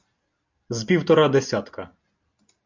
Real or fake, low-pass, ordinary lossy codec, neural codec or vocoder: real; 7.2 kHz; MP3, 64 kbps; none